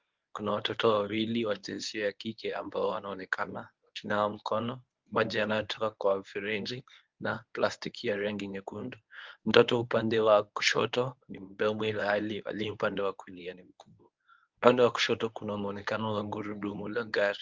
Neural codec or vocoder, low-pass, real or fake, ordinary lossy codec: codec, 24 kHz, 0.9 kbps, WavTokenizer, medium speech release version 1; 7.2 kHz; fake; Opus, 24 kbps